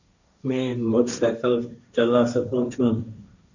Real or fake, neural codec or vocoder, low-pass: fake; codec, 16 kHz, 1.1 kbps, Voila-Tokenizer; 7.2 kHz